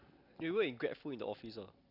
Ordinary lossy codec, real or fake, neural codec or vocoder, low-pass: none; real; none; 5.4 kHz